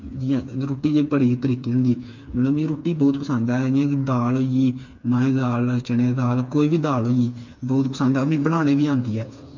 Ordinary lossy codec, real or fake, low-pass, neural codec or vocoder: MP3, 48 kbps; fake; 7.2 kHz; codec, 16 kHz, 4 kbps, FreqCodec, smaller model